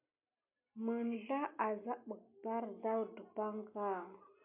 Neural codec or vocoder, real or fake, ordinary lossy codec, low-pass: none; real; MP3, 32 kbps; 3.6 kHz